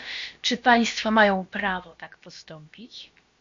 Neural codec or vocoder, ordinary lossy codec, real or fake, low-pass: codec, 16 kHz, about 1 kbps, DyCAST, with the encoder's durations; MP3, 48 kbps; fake; 7.2 kHz